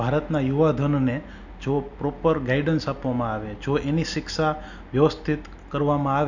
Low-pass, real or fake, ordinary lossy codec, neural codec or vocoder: 7.2 kHz; real; none; none